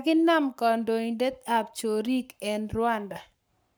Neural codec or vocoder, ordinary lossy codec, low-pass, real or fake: codec, 44.1 kHz, 7.8 kbps, Pupu-Codec; none; none; fake